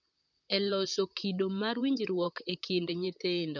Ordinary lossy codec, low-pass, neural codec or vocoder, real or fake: none; 7.2 kHz; vocoder, 44.1 kHz, 128 mel bands, Pupu-Vocoder; fake